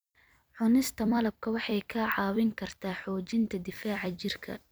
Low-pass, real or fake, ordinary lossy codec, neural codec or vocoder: none; fake; none; vocoder, 44.1 kHz, 128 mel bands every 256 samples, BigVGAN v2